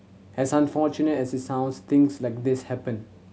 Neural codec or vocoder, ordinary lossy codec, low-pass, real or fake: none; none; none; real